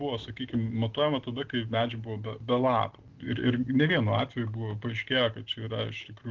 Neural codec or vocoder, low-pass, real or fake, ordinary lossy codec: none; 7.2 kHz; real; Opus, 16 kbps